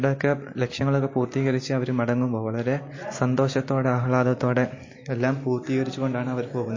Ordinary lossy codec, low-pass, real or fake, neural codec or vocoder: MP3, 32 kbps; 7.2 kHz; real; none